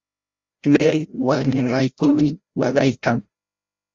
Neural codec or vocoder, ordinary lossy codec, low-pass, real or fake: codec, 16 kHz, 0.5 kbps, FreqCodec, larger model; Opus, 32 kbps; 7.2 kHz; fake